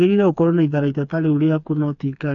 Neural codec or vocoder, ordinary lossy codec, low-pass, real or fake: codec, 16 kHz, 4 kbps, FreqCodec, smaller model; none; 7.2 kHz; fake